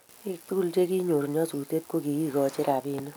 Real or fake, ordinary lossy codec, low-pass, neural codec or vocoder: real; none; none; none